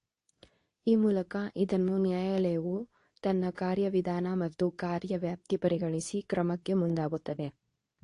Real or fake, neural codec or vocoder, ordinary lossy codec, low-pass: fake; codec, 24 kHz, 0.9 kbps, WavTokenizer, medium speech release version 2; AAC, 48 kbps; 10.8 kHz